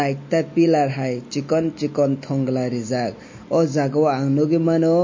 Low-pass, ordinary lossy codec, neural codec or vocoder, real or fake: 7.2 kHz; MP3, 32 kbps; none; real